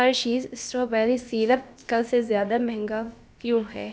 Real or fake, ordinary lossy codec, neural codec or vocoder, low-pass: fake; none; codec, 16 kHz, about 1 kbps, DyCAST, with the encoder's durations; none